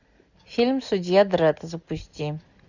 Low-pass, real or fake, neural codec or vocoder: 7.2 kHz; real; none